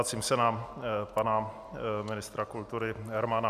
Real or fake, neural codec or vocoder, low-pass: real; none; 14.4 kHz